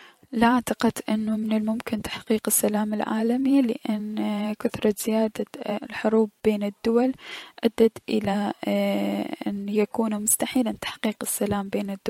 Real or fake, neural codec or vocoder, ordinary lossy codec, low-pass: fake; vocoder, 44.1 kHz, 128 mel bands every 512 samples, BigVGAN v2; MP3, 64 kbps; 19.8 kHz